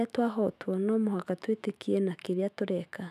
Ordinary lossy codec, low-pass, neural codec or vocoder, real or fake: none; 14.4 kHz; autoencoder, 48 kHz, 128 numbers a frame, DAC-VAE, trained on Japanese speech; fake